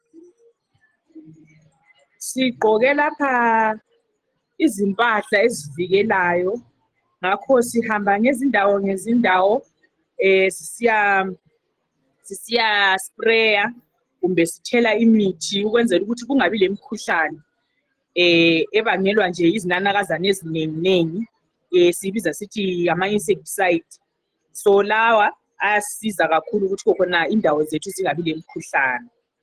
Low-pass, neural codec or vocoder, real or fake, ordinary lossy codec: 14.4 kHz; none; real; Opus, 16 kbps